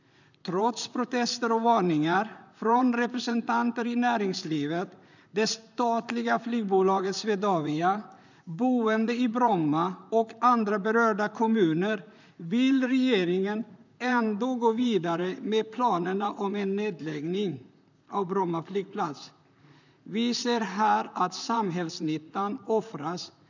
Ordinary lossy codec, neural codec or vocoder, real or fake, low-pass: none; vocoder, 44.1 kHz, 128 mel bands, Pupu-Vocoder; fake; 7.2 kHz